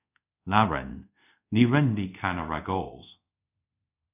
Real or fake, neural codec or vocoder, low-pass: fake; codec, 24 kHz, 0.5 kbps, DualCodec; 3.6 kHz